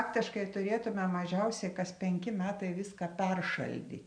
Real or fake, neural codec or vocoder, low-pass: real; none; 9.9 kHz